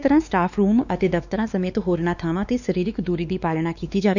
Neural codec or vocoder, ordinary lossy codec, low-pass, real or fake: codec, 16 kHz, 2 kbps, X-Codec, WavLM features, trained on Multilingual LibriSpeech; none; 7.2 kHz; fake